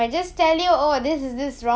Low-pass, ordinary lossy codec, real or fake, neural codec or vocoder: none; none; real; none